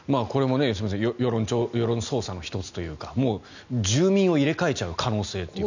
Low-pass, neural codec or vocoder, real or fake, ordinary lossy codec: 7.2 kHz; none; real; none